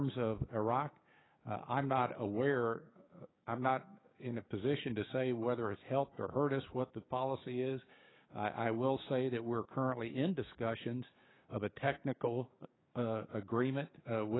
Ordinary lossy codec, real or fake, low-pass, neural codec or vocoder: AAC, 16 kbps; fake; 7.2 kHz; codec, 16 kHz, 6 kbps, DAC